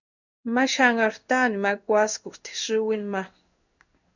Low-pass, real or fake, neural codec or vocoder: 7.2 kHz; fake; codec, 16 kHz in and 24 kHz out, 1 kbps, XY-Tokenizer